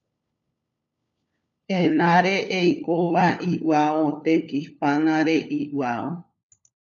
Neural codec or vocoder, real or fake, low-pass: codec, 16 kHz, 4 kbps, FunCodec, trained on LibriTTS, 50 frames a second; fake; 7.2 kHz